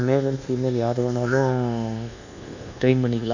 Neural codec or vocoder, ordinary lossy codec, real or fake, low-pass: codec, 24 kHz, 1.2 kbps, DualCodec; none; fake; 7.2 kHz